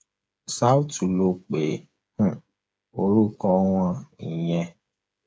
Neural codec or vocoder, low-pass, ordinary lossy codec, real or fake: codec, 16 kHz, 8 kbps, FreqCodec, smaller model; none; none; fake